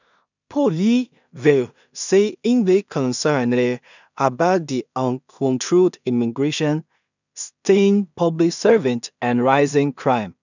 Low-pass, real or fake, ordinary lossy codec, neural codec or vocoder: 7.2 kHz; fake; none; codec, 16 kHz in and 24 kHz out, 0.4 kbps, LongCat-Audio-Codec, two codebook decoder